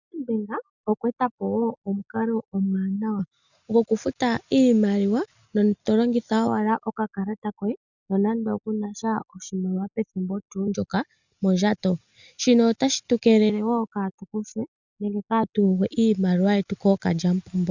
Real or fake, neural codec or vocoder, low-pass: real; none; 7.2 kHz